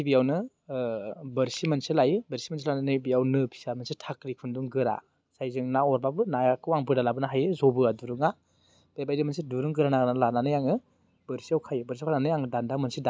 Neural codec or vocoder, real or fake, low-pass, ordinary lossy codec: none; real; none; none